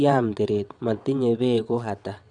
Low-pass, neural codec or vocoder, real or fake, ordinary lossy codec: 9.9 kHz; vocoder, 22.05 kHz, 80 mel bands, WaveNeXt; fake; none